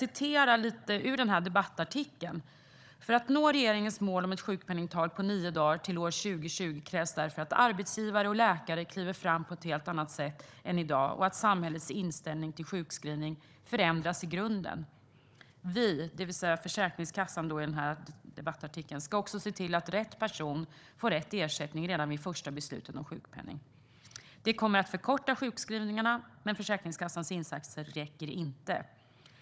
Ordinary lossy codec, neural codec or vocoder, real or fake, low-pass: none; codec, 16 kHz, 16 kbps, FunCodec, trained on Chinese and English, 50 frames a second; fake; none